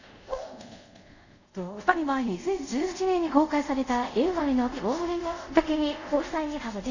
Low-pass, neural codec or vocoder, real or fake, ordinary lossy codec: 7.2 kHz; codec, 24 kHz, 0.5 kbps, DualCodec; fake; none